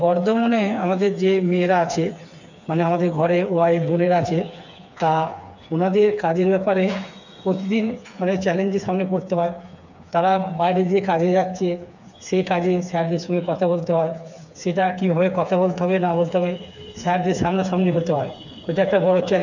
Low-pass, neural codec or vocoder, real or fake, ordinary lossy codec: 7.2 kHz; codec, 16 kHz, 4 kbps, FreqCodec, smaller model; fake; none